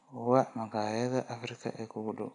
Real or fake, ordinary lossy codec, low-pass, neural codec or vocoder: real; none; 10.8 kHz; none